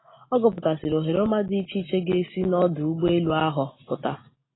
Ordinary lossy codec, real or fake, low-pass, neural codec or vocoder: AAC, 16 kbps; real; 7.2 kHz; none